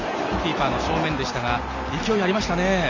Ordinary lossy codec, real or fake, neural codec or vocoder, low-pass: none; real; none; 7.2 kHz